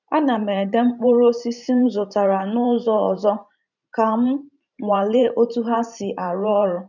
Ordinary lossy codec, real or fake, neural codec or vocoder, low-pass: none; fake; vocoder, 44.1 kHz, 128 mel bands every 512 samples, BigVGAN v2; 7.2 kHz